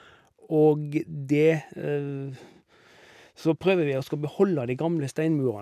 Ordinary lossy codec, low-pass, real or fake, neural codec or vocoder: none; 14.4 kHz; real; none